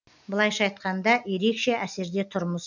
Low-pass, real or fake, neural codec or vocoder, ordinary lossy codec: 7.2 kHz; real; none; none